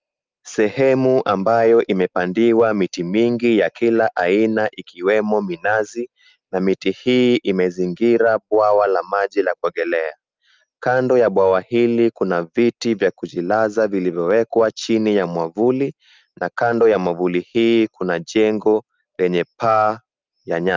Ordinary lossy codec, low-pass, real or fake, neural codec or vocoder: Opus, 24 kbps; 7.2 kHz; real; none